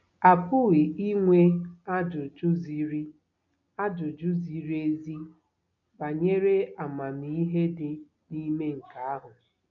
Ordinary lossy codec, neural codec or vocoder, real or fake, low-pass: none; none; real; 7.2 kHz